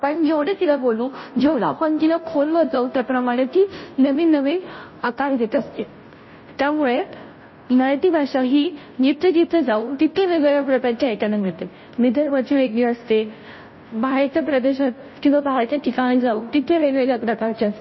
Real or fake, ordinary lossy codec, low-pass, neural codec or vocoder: fake; MP3, 24 kbps; 7.2 kHz; codec, 16 kHz, 0.5 kbps, FunCodec, trained on Chinese and English, 25 frames a second